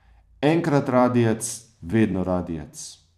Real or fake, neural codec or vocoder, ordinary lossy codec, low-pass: fake; vocoder, 44.1 kHz, 128 mel bands every 256 samples, BigVGAN v2; none; 14.4 kHz